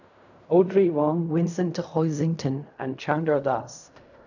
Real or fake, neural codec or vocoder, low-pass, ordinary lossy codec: fake; codec, 16 kHz in and 24 kHz out, 0.4 kbps, LongCat-Audio-Codec, fine tuned four codebook decoder; 7.2 kHz; none